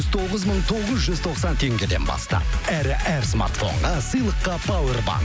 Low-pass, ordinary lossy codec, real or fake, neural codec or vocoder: none; none; real; none